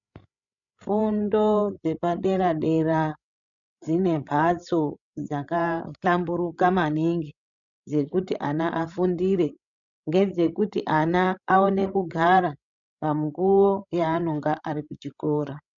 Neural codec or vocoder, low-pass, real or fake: codec, 16 kHz, 16 kbps, FreqCodec, larger model; 7.2 kHz; fake